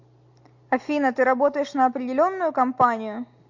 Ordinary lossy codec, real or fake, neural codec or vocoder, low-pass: MP3, 48 kbps; real; none; 7.2 kHz